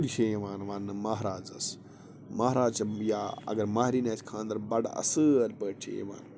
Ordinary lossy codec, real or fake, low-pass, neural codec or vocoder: none; real; none; none